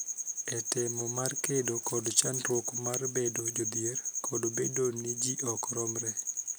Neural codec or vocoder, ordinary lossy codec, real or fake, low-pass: none; none; real; none